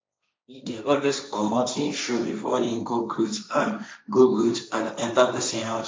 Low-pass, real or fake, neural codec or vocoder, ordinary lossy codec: none; fake; codec, 16 kHz, 1.1 kbps, Voila-Tokenizer; none